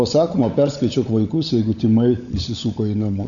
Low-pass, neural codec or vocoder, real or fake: 7.2 kHz; codec, 16 kHz, 16 kbps, FunCodec, trained on LibriTTS, 50 frames a second; fake